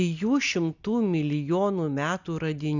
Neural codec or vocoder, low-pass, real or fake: none; 7.2 kHz; real